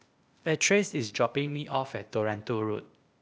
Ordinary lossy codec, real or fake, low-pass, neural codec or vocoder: none; fake; none; codec, 16 kHz, 0.8 kbps, ZipCodec